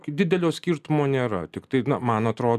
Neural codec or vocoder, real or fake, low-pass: none; real; 14.4 kHz